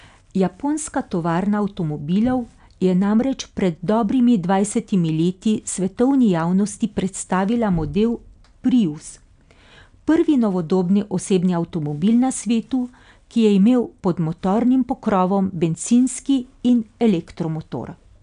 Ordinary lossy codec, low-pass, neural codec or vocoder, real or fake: none; 9.9 kHz; none; real